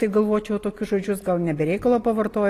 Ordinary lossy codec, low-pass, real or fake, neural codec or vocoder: AAC, 48 kbps; 14.4 kHz; real; none